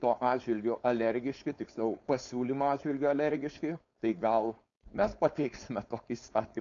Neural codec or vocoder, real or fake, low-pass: codec, 16 kHz, 4.8 kbps, FACodec; fake; 7.2 kHz